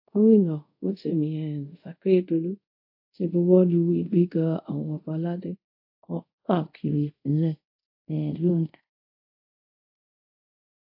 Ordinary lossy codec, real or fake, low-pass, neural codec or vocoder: AAC, 48 kbps; fake; 5.4 kHz; codec, 24 kHz, 0.5 kbps, DualCodec